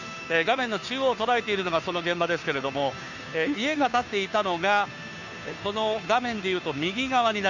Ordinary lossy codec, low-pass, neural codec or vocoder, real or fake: none; 7.2 kHz; codec, 16 kHz, 2 kbps, FunCodec, trained on Chinese and English, 25 frames a second; fake